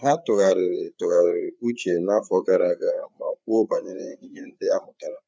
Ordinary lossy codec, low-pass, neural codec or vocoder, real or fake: none; none; codec, 16 kHz, 4 kbps, FreqCodec, larger model; fake